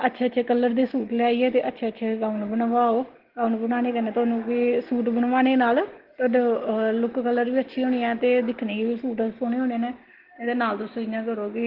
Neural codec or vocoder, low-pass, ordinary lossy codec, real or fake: none; 5.4 kHz; Opus, 16 kbps; real